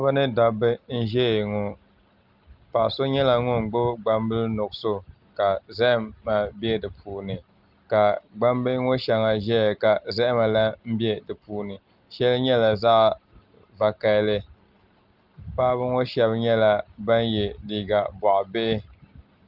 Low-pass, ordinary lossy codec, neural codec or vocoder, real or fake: 5.4 kHz; Opus, 32 kbps; none; real